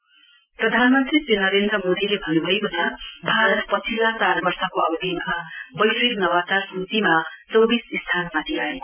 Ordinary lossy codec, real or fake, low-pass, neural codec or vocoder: none; real; 3.6 kHz; none